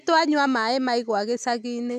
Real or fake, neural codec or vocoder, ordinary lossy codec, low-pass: real; none; none; 14.4 kHz